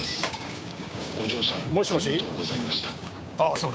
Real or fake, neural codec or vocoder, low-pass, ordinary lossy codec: fake; codec, 16 kHz, 6 kbps, DAC; none; none